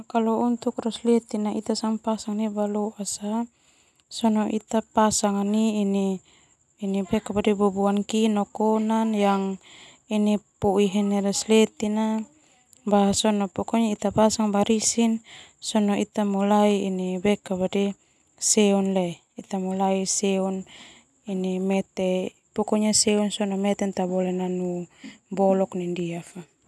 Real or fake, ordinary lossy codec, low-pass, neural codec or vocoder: real; none; none; none